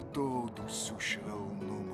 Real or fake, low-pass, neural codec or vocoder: real; 14.4 kHz; none